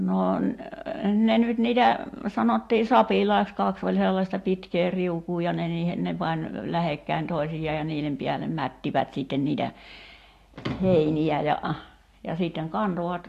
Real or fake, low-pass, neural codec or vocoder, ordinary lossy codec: real; 14.4 kHz; none; AAC, 64 kbps